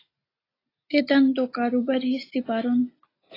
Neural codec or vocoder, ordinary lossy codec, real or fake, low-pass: none; AAC, 24 kbps; real; 5.4 kHz